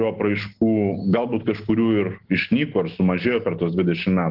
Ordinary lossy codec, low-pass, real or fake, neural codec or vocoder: Opus, 16 kbps; 5.4 kHz; real; none